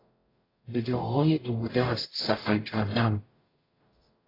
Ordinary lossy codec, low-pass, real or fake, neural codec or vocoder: AAC, 24 kbps; 5.4 kHz; fake; codec, 44.1 kHz, 0.9 kbps, DAC